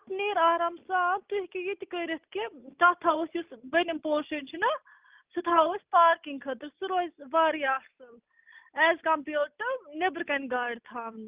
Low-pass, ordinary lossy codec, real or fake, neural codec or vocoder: 3.6 kHz; Opus, 32 kbps; real; none